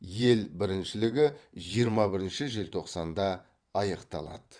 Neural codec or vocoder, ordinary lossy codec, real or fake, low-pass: vocoder, 22.05 kHz, 80 mel bands, WaveNeXt; Opus, 64 kbps; fake; 9.9 kHz